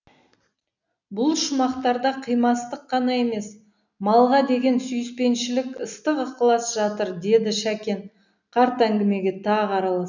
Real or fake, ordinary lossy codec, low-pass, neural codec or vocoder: real; none; 7.2 kHz; none